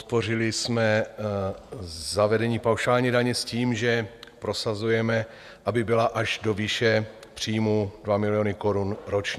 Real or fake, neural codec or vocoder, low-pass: fake; vocoder, 48 kHz, 128 mel bands, Vocos; 14.4 kHz